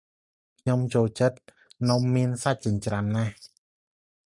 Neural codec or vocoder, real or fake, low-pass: none; real; 10.8 kHz